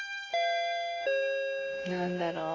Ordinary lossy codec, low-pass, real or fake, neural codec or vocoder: AAC, 32 kbps; 7.2 kHz; real; none